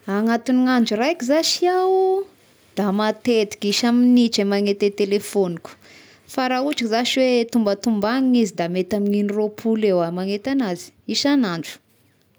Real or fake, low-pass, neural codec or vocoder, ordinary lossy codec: real; none; none; none